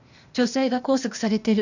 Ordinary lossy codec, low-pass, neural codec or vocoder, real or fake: none; 7.2 kHz; codec, 16 kHz, 0.8 kbps, ZipCodec; fake